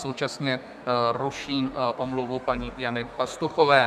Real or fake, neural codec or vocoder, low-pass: fake; codec, 32 kHz, 1.9 kbps, SNAC; 14.4 kHz